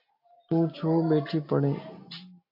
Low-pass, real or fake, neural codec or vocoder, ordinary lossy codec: 5.4 kHz; real; none; AAC, 48 kbps